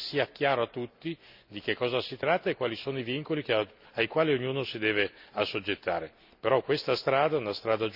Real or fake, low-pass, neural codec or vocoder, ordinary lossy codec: real; 5.4 kHz; none; none